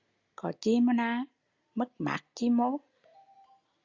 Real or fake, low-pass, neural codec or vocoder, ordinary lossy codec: real; 7.2 kHz; none; Opus, 64 kbps